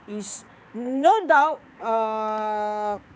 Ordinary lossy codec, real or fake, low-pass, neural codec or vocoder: none; fake; none; codec, 16 kHz, 4 kbps, X-Codec, HuBERT features, trained on balanced general audio